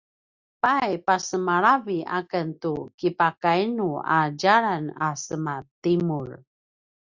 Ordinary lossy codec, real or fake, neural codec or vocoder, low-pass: Opus, 64 kbps; real; none; 7.2 kHz